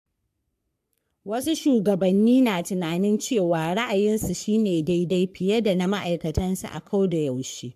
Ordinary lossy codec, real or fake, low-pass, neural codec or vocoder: AAC, 96 kbps; fake; 14.4 kHz; codec, 44.1 kHz, 3.4 kbps, Pupu-Codec